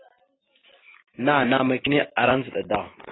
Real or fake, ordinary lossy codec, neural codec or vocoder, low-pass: real; AAC, 16 kbps; none; 7.2 kHz